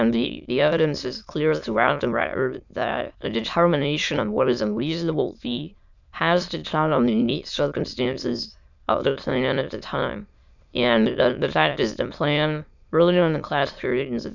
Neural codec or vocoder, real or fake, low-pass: autoencoder, 22.05 kHz, a latent of 192 numbers a frame, VITS, trained on many speakers; fake; 7.2 kHz